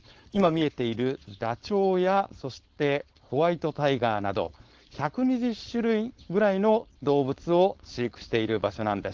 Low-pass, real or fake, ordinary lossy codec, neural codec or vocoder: 7.2 kHz; fake; Opus, 16 kbps; codec, 16 kHz, 4.8 kbps, FACodec